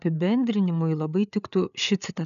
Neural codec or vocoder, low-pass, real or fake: codec, 16 kHz, 8 kbps, FreqCodec, larger model; 7.2 kHz; fake